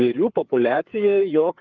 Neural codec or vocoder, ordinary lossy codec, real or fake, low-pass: codec, 16 kHz, 4 kbps, FreqCodec, larger model; Opus, 24 kbps; fake; 7.2 kHz